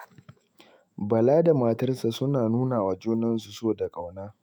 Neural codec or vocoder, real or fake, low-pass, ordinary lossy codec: autoencoder, 48 kHz, 128 numbers a frame, DAC-VAE, trained on Japanese speech; fake; none; none